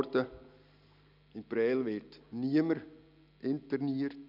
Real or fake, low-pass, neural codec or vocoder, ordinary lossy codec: real; 5.4 kHz; none; none